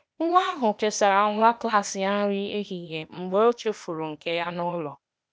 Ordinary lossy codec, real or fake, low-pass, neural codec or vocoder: none; fake; none; codec, 16 kHz, 0.8 kbps, ZipCodec